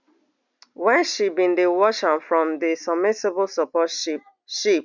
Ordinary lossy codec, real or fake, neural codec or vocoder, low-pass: none; real; none; 7.2 kHz